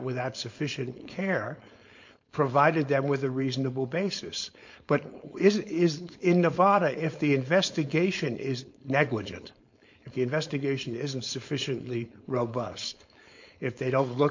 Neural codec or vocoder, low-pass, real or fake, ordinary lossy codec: codec, 16 kHz, 4.8 kbps, FACodec; 7.2 kHz; fake; MP3, 48 kbps